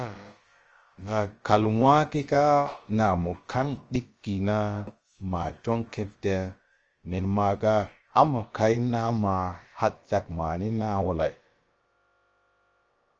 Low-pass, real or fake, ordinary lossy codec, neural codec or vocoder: 7.2 kHz; fake; Opus, 24 kbps; codec, 16 kHz, about 1 kbps, DyCAST, with the encoder's durations